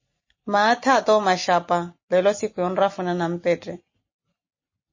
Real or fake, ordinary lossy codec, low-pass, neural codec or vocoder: real; MP3, 32 kbps; 7.2 kHz; none